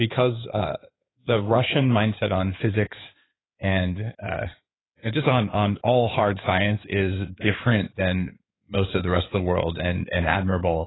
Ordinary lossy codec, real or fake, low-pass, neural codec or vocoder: AAC, 16 kbps; fake; 7.2 kHz; codec, 16 kHz in and 24 kHz out, 2.2 kbps, FireRedTTS-2 codec